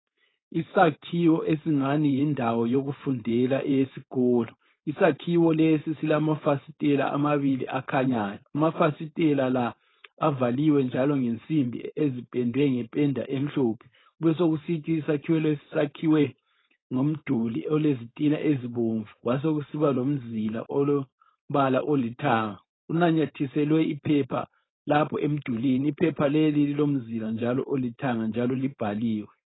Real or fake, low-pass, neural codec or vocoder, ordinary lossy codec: fake; 7.2 kHz; codec, 16 kHz, 4.8 kbps, FACodec; AAC, 16 kbps